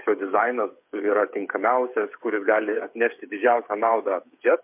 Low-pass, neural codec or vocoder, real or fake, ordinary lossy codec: 3.6 kHz; codec, 16 kHz, 8 kbps, FreqCodec, smaller model; fake; MP3, 32 kbps